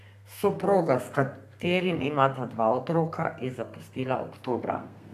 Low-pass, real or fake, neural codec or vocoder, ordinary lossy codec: 14.4 kHz; fake; codec, 32 kHz, 1.9 kbps, SNAC; AAC, 96 kbps